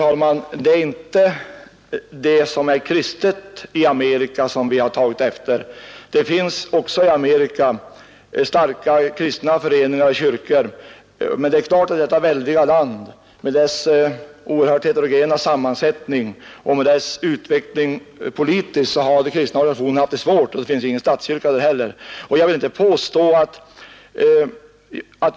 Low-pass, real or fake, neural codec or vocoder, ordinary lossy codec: none; real; none; none